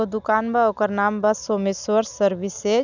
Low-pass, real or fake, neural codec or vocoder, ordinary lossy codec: 7.2 kHz; real; none; none